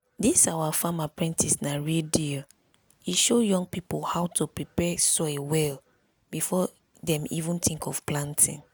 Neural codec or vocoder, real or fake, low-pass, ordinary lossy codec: none; real; none; none